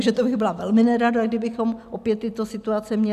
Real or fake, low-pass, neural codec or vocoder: real; 14.4 kHz; none